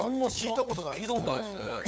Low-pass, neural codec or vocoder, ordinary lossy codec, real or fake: none; codec, 16 kHz, 8 kbps, FunCodec, trained on LibriTTS, 25 frames a second; none; fake